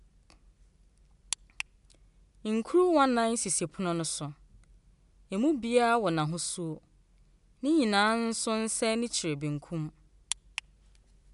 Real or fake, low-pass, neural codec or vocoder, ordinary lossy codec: real; 10.8 kHz; none; none